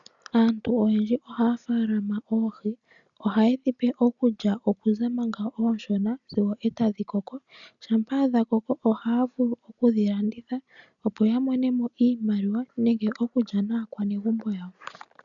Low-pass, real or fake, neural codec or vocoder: 7.2 kHz; real; none